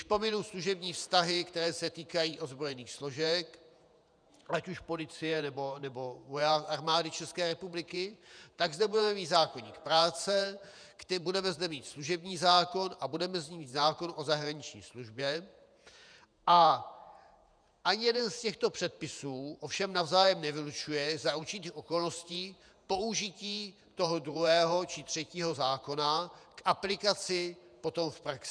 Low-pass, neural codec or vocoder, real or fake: 9.9 kHz; vocoder, 48 kHz, 128 mel bands, Vocos; fake